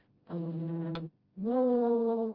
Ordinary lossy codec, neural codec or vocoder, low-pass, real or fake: Opus, 24 kbps; codec, 16 kHz, 0.5 kbps, FreqCodec, smaller model; 5.4 kHz; fake